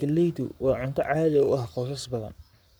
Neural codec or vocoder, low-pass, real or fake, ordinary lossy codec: codec, 44.1 kHz, 7.8 kbps, Pupu-Codec; none; fake; none